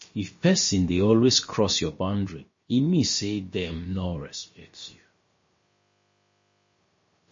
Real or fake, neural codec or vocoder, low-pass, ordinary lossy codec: fake; codec, 16 kHz, about 1 kbps, DyCAST, with the encoder's durations; 7.2 kHz; MP3, 32 kbps